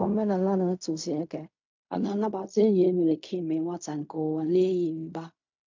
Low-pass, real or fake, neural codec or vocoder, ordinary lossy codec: 7.2 kHz; fake; codec, 16 kHz in and 24 kHz out, 0.4 kbps, LongCat-Audio-Codec, fine tuned four codebook decoder; MP3, 64 kbps